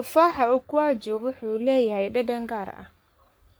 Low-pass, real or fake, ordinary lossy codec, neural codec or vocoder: none; fake; none; codec, 44.1 kHz, 3.4 kbps, Pupu-Codec